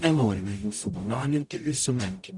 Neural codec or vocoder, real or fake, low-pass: codec, 44.1 kHz, 0.9 kbps, DAC; fake; 10.8 kHz